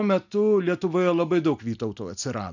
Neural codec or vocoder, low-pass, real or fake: none; 7.2 kHz; real